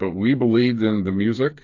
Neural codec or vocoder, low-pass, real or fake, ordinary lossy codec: codec, 16 kHz, 4 kbps, FreqCodec, smaller model; 7.2 kHz; fake; AAC, 48 kbps